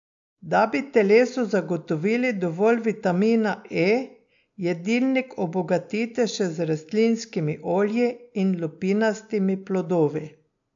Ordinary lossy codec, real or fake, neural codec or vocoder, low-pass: AAC, 64 kbps; real; none; 7.2 kHz